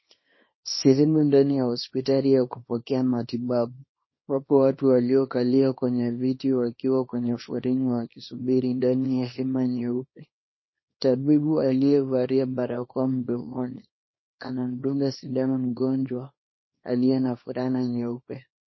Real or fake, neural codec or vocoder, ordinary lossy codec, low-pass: fake; codec, 24 kHz, 0.9 kbps, WavTokenizer, small release; MP3, 24 kbps; 7.2 kHz